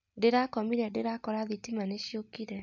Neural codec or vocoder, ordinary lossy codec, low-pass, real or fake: none; none; 7.2 kHz; real